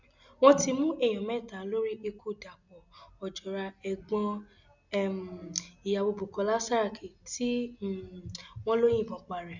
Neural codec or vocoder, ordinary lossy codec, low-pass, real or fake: none; none; 7.2 kHz; real